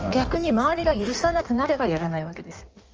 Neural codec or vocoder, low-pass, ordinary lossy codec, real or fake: codec, 16 kHz in and 24 kHz out, 1.1 kbps, FireRedTTS-2 codec; 7.2 kHz; Opus, 24 kbps; fake